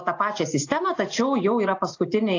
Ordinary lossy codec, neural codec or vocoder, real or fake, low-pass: AAC, 48 kbps; none; real; 7.2 kHz